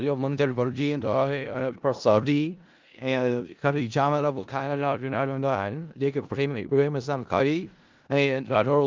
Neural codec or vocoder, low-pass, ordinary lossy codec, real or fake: codec, 16 kHz in and 24 kHz out, 0.4 kbps, LongCat-Audio-Codec, four codebook decoder; 7.2 kHz; Opus, 32 kbps; fake